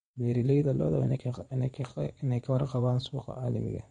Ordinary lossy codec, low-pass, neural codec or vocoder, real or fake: MP3, 48 kbps; 9.9 kHz; vocoder, 22.05 kHz, 80 mel bands, Vocos; fake